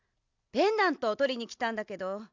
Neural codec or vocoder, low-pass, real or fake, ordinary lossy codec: none; 7.2 kHz; real; none